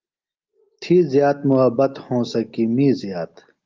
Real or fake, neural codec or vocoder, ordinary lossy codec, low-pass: real; none; Opus, 32 kbps; 7.2 kHz